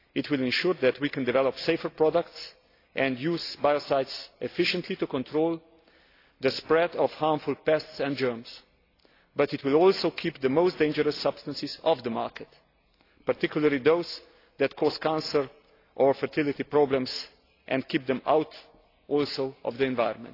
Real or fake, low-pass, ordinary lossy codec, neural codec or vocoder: real; 5.4 kHz; AAC, 32 kbps; none